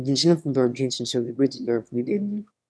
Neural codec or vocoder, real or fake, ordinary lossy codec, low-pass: autoencoder, 22.05 kHz, a latent of 192 numbers a frame, VITS, trained on one speaker; fake; none; none